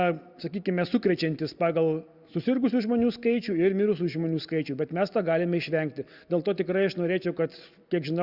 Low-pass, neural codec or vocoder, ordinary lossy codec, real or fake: 5.4 kHz; none; Opus, 64 kbps; real